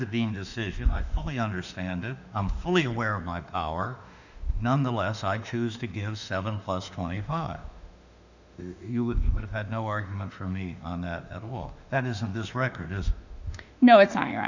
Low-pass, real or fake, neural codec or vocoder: 7.2 kHz; fake; autoencoder, 48 kHz, 32 numbers a frame, DAC-VAE, trained on Japanese speech